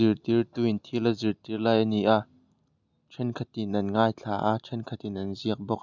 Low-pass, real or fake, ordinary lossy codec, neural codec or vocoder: 7.2 kHz; real; none; none